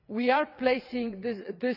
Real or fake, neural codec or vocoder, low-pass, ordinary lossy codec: fake; vocoder, 22.05 kHz, 80 mel bands, WaveNeXt; 5.4 kHz; none